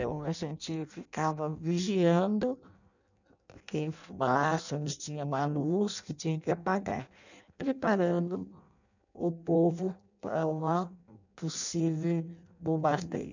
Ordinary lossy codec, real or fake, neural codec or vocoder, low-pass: none; fake; codec, 16 kHz in and 24 kHz out, 0.6 kbps, FireRedTTS-2 codec; 7.2 kHz